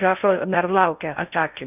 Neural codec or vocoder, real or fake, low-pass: codec, 16 kHz in and 24 kHz out, 0.6 kbps, FocalCodec, streaming, 4096 codes; fake; 3.6 kHz